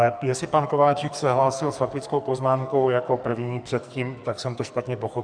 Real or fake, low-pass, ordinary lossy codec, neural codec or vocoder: fake; 9.9 kHz; Opus, 64 kbps; codec, 44.1 kHz, 2.6 kbps, SNAC